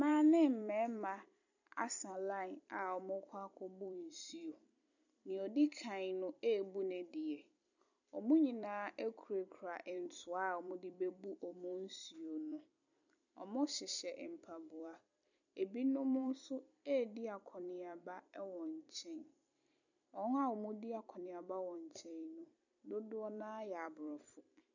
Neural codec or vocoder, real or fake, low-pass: vocoder, 44.1 kHz, 128 mel bands every 256 samples, BigVGAN v2; fake; 7.2 kHz